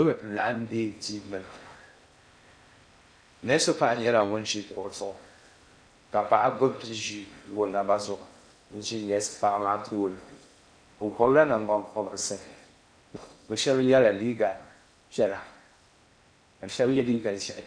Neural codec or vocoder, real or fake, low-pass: codec, 16 kHz in and 24 kHz out, 0.6 kbps, FocalCodec, streaming, 4096 codes; fake; 9.9 kHz